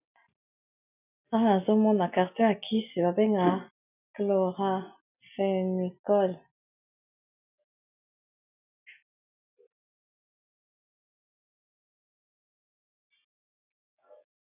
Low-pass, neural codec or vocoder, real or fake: 3.6 kHz; none; real